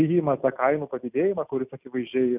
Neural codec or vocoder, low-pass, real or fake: none; 3.6 kHz; real